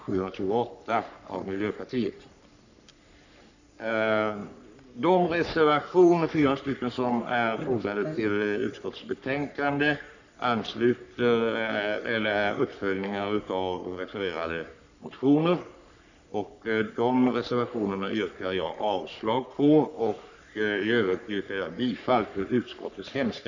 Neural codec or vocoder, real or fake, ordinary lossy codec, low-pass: codec, 44.1 kHz, 3.4 kbps, Pupu-Codec; fake; none; 7.2 kHz